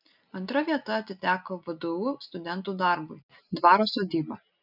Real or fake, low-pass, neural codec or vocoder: real; 5.4 kHz; none